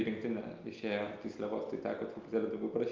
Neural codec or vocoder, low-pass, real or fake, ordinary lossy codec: none; 7.2 kHz; real; Opus, 32 kbps